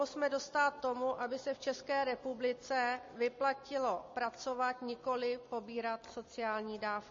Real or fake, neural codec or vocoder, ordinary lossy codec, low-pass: real; none; MP3, 32 kbps; 7.2 kHz